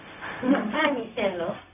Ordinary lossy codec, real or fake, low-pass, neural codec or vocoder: none; fake; 3.6 kHz; codec, 16 kHz, 0.4 kbps, LongCat-Audio-Codec